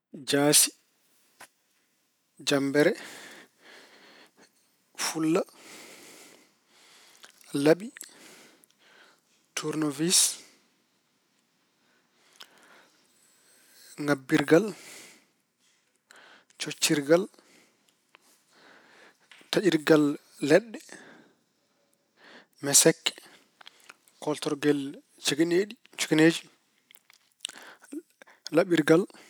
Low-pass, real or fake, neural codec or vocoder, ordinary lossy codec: none; real; none; none